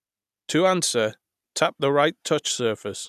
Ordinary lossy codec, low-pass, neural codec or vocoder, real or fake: none; 14.4 kHz; none; real